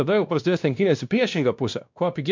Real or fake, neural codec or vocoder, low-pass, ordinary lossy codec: fake; codec, 16 kHz, 1 kbps, X-Codec, WavLM features, trained on Multilingual LibriSpeech; 7.2 kHz; MP3, 64 kbps